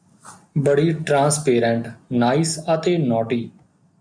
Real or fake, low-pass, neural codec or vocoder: real; 9.9 kHz; none